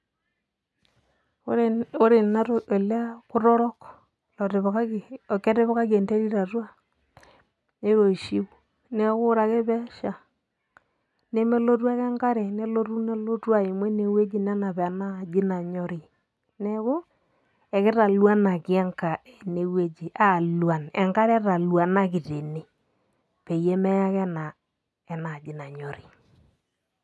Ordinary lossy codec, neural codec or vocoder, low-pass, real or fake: none; none; none; real